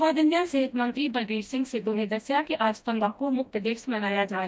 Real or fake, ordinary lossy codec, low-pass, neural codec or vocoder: fake; none; none; codec, 16 kHz, 1 kbps, FreqCodec, smaller model